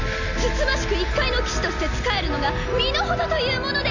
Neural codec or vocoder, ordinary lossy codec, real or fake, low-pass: none; none; real; 7.2 kHz